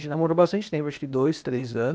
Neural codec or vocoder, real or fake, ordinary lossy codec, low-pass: codec, 16 kHz, 0.8 kbps, ZipCodec; fake; none; none